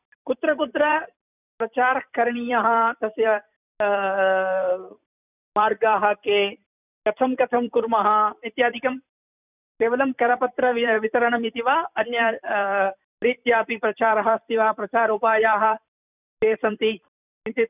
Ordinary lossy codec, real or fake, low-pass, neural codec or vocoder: none; fake; 3.6 kHz; vocoder, 44.1 kHz, 128 mel bands every 256 samples, BigVGAN v2